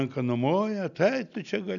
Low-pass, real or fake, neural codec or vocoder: 7.2 kHz; real; none